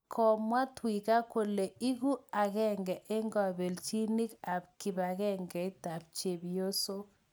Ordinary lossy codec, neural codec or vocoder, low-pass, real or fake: none; none; none; real